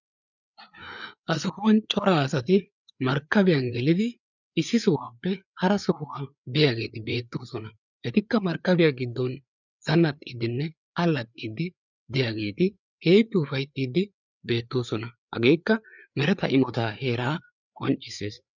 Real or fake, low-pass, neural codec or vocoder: fake; 7.2 kHz; codec, 16 kHz, 4 kbps, FreqCodec, larger model